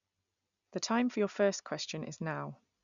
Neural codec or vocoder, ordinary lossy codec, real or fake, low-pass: none; none; real; 7.2 kHz